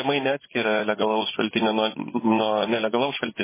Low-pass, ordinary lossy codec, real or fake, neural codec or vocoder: 3.6 kHz; MP3, 16 kbps; fake; vocoder, 24 kHz, 100 mel bands, Vocos